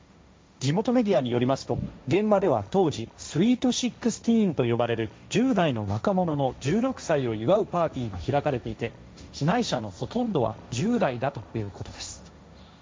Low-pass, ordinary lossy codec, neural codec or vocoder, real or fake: none; none; codec, 16 kHz, 1.1 kbps, Voila-Tokenizer; fake